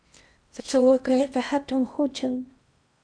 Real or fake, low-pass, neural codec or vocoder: fake; 9.9 kHz; codec, 16 kHz in and 24 kHz out, 0.6 kbps, FocalCodec, streaming, 4096 codes